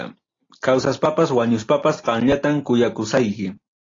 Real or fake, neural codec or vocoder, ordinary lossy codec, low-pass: real; none; AAC, 32 kbps; 7.2 kHz